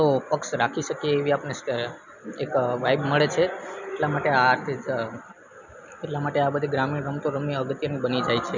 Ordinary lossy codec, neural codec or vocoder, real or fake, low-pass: none; none; real; 7.2 kHz